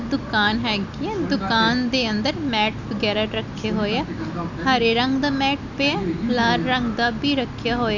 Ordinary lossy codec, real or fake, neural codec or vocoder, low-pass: none; real; none; 7.2 kHz